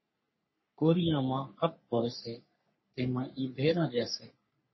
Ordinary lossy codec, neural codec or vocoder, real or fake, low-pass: MP3, 24 kbps; codec, 44.1 kHz, 3.4 kbps, Pupu-Codec; fake; 7.2 kHz